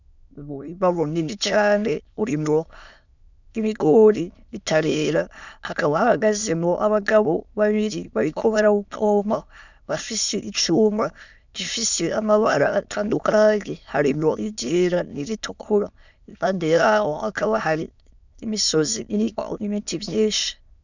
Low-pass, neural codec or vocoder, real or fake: 7.2 kHz; autoencoder, 22.05 kHz, a latent of 192 numbers a frame, VITS, trained on many speakers; fake